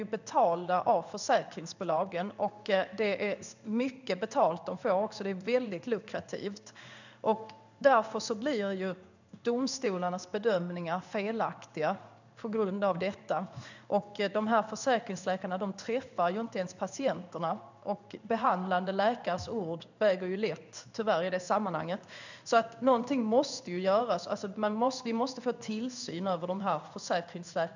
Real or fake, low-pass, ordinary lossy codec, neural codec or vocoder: fake; 7.2 kHz; none; codec, 16 kHz in and 24 kHz out, 1 kbps, XY-Tokenizer